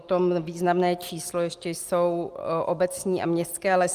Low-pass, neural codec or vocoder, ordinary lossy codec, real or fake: 14.4 kHz; none; Opus, 24 kbps; real